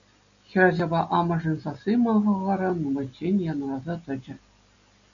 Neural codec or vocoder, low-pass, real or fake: none; 7.2 kHz; real